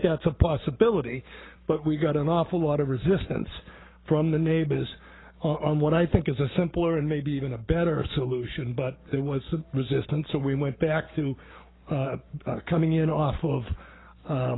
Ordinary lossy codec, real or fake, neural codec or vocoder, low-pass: AAC, 16 kbps; fake; codec, 44.1 kHz, 7.8 kbps, Pupu-Codec; 7.2 kHz